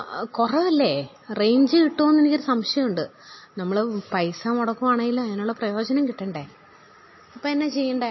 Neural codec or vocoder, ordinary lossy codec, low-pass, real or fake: none; MP3, 24 kbps; 7.2 kHz; real